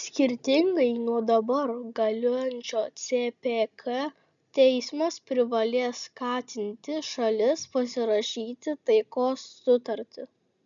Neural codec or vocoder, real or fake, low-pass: none; real; 7.2 kHz